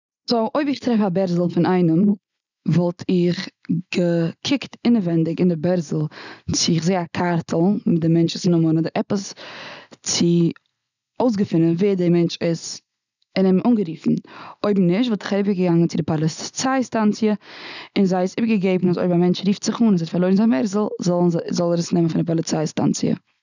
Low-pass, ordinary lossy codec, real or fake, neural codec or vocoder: 7.2 kHz; none; real; none